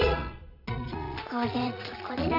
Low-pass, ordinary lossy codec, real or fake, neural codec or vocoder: 5.4 kHz; none; fake; vocoder, 22.05 kHz, 80 mel bands, Vocos